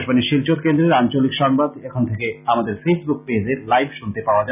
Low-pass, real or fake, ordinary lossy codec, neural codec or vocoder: 3.6 kHz; real; none; none